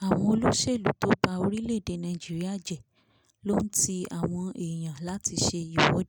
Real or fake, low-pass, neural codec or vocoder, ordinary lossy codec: real; none; none; none